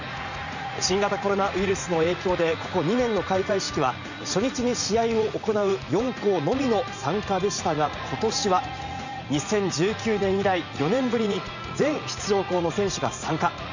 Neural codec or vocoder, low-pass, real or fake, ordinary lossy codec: vocoder, 44.1 kHz, 80 mel bands, Vocos; 7.2 kHz; fake; none